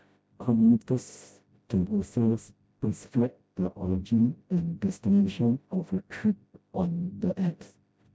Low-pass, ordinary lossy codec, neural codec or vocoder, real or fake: none; none; codec, 16 kHz, 0.5 kbps, FreqCodec, smaller model; fake